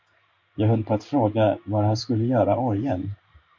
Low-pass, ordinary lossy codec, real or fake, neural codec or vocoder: 7.2 kHz; MP3, 48 kbps; fake; codec, 16 kHz in and 24 kHz out, 1 kbps, XY-Tokenizer